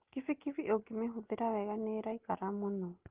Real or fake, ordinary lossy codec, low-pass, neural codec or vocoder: real; Opus, 16 kbps; 3.6 kHz; none